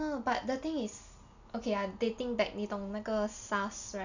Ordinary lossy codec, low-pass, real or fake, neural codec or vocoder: none; 7.2 kHz; real; none